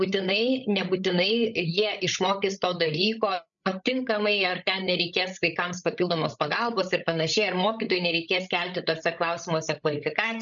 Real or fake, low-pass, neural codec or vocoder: fake; 7.2 kHz; codec, 16 kHz, 8 kbps, FreqCodec, larger model